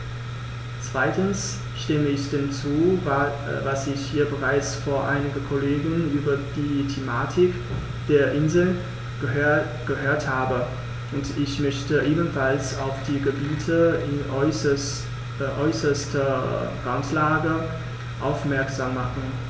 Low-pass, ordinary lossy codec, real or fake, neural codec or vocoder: none; none; real; none